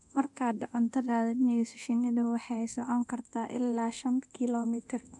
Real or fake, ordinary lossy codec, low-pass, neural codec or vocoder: fake; none; 10.8 kHz; codec, 24 kHz, 0.9 kbps, DualCodec